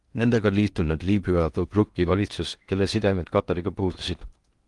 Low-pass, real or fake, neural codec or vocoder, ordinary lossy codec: 10.8 kHz; fake; codec, 16 kHz in and 24 kHz out, 0.8 kbps, FocalCodec, streaming, 65536 codes; Opus, 24 kbps